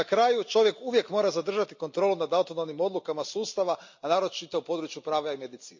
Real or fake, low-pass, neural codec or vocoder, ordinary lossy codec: real; 7.2 kHz; none; MP3, 64 kbps